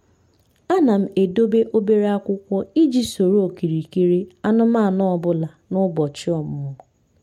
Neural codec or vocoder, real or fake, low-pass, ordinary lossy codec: none; real; 19.8 kHz; MP3, 64 kbps